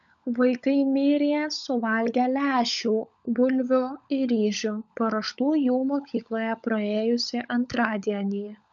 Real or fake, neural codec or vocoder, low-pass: fake; codec, 16 kHz, 16 kbps, FunCodec, trained on LibriTTS, 50 frames a second; 7.2 kHz